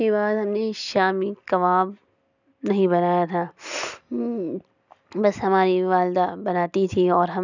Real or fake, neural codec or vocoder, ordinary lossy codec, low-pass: real; none; none; 7.2 kHz